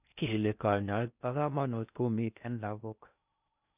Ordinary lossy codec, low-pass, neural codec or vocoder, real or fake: none; 3.6 kHz; codec, 16 kHz in and 24 kHz out, 0.6 kbps, FocalCodec, streaming, 4096 codes; fake